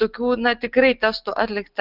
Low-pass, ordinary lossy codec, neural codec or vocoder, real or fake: 5.4 kHz; Opus, 24 kbps; none; real